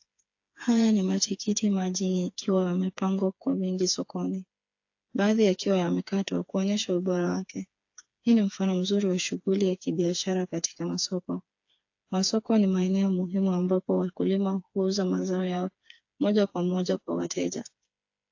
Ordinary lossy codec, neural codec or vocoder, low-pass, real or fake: AAC, 48 kbps; codec, 16 kHz, 4 kbps, FreqCodec, smaller model; 7.2 kHz; fake